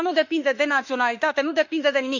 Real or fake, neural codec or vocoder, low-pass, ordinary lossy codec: fake; autoencoder, 48 kHz, 32 numbers a frame, DAC-VAE, trained on Japanese speech; 7.2 kHz; none